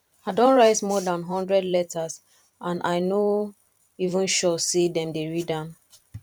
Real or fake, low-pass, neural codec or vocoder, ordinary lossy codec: fake; 19.8 kHz; vocoder, 44.1 kHz, 128 mel bands every 512 samples, BigVGAN v2; none